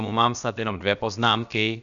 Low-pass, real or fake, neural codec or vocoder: 7.2 kHz; fake; codec, 16 kHz, about 1 kbps, DyCAST, with the encoder's durations